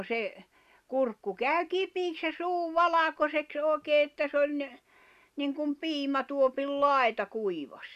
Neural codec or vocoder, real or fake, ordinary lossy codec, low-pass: none; real; none; 14.4 kHz